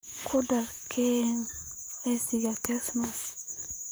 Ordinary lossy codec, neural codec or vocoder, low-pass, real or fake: none; vocoder, 44.1 kHz, 128 mel bands, Pupu-Vocoder; none; fake